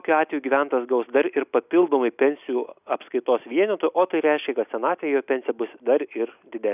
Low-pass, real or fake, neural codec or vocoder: 3.6 kHz; real; none